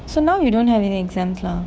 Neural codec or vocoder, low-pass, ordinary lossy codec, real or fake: codec, 16 kHz, 6 kbps, DAC; none; none; fake